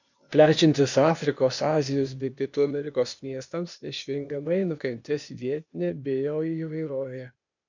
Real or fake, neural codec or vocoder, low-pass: fake; codec, 16 kHz, 0.8 kbps, ZipCodec; 7.2 kHz